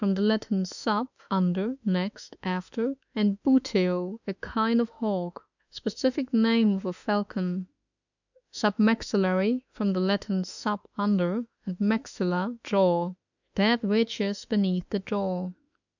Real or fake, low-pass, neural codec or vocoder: fake; 7.2 kHz; autoencoder, 48 kHz, 32 numbers a frame, DAC-VAE, trained on Japanese speech